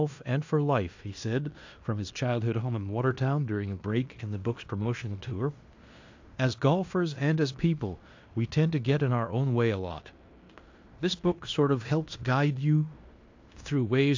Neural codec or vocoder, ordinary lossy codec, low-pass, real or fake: codec, 16 kHz in and 24 kHz out, 0.9 kbps, LongCat-Audio-Codec, fine tuned four codebook decoder; AAC, 48 kbps; 7.2 kHz; fake